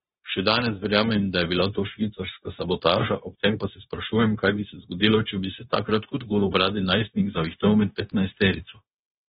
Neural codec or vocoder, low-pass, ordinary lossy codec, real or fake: codec, 16 kHz, 0.9 kbps, LongCat-Audio-Codec; 7.2 kHz; AAC, 16 kbps; fake